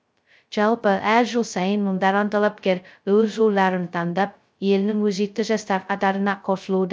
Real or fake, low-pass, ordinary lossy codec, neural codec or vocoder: fake; none; none; codec, 16 kHz, 0.2 kbps, FocalCodec